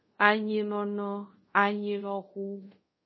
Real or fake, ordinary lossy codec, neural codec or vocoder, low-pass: fake; MP3, 24 kbps; codec, 24 kHz, 0.5 kbps, DualCodec; 7.2 kHz